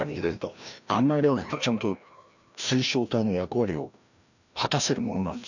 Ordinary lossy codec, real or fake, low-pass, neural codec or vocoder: none; fake; 7.2 kHz; codec, 16 kHz, 1 kbps, FreqCodec, larger model